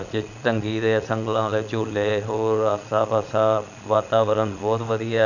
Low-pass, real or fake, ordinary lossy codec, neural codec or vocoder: 7.2 kHz; fake; none; vocoder, 22.05 kHz, 80 mel bands, Vocos